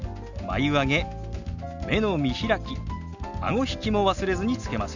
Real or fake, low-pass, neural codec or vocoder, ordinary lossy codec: real; 7.2 kHz; none; none